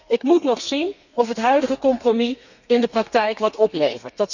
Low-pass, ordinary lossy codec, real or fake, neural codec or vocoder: 7.2 kHz; none; fake; codec, 32 kHz, 1.9 kbps, SNAC